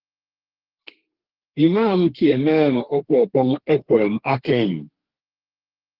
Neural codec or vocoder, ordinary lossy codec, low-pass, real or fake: codec, 32 kHz, 1.9 kbps, SNAC; Opus, 16 kbps; 5.4 kHz; fake